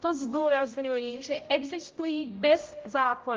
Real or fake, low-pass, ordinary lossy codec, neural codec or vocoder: fake; 7.2 kHz; Opus, 32 kbps; codec, 16 kHz, 0.5 kbps, X-Codec, HuBERT features, trained on general audio